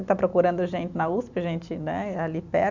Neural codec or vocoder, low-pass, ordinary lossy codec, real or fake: vocoder, 44.1 kHz, 128 mel bands every 256 samples, BigVGAN v2; 7.2 kHz; none; fake